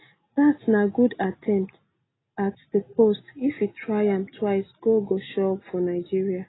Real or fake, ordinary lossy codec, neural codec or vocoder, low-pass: real; AAC, 16 kbps; none; 7.2 kHz